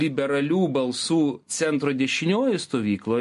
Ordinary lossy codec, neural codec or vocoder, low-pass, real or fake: MP3, 48 kbps; none; 14.4 kHz; real